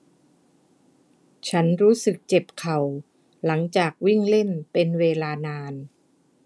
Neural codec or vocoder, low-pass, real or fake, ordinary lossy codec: none; none; real; none